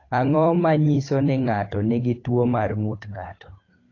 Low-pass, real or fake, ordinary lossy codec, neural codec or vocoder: 7.2 kHz; fake; AAC, 32 kbps; codec, 16 kHz, 8 kbps, FunCodec, trained on Chinese and English, 25 frames a second